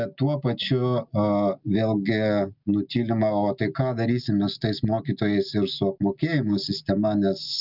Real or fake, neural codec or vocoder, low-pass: real; none; 5.4 kHz